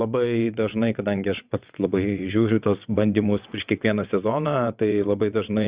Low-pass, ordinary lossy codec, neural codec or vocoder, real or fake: 3.6 kHz; Opus, 64 kbps; vocoder, 22.05 kHz, 80 mel bands, WaveNeXt; fake